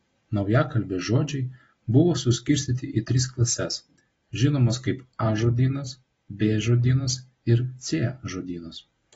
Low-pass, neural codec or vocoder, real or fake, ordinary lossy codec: 10.8 kHz; none; real; AAC, 24 kbps